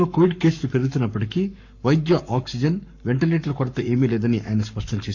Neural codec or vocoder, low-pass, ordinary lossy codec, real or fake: codec, 44.1 kHz, 7.8 kbps, Pupu-Codec; 7.2 kHz; none; fake